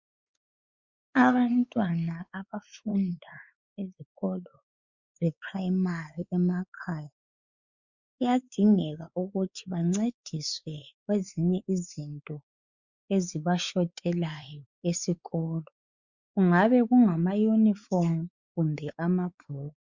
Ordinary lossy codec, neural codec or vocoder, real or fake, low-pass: Opus, 64 kbps; codec, 16 kHz, 4 kbps, X-Codec, WavLM features, trained on Multilingual LibriSpeech; fake; 7.2 kHz